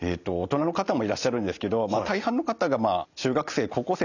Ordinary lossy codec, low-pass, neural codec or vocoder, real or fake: none; 7.2 kHz; none; real